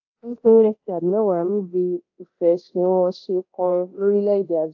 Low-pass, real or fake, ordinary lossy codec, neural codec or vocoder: 7.2 kHz; fake; AAC, 48 kbps; codec, 24 kHz, 0.9 kbps, DualCodec